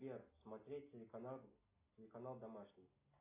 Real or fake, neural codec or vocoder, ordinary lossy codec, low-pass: real; none; AAC, 32 kbps; 3.6 kHz